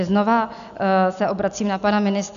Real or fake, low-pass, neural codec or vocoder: real; 7.2 kHz; none